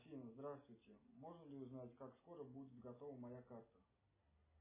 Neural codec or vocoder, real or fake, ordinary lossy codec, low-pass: none; real; MP3, 16 kbps; 3.6 kHz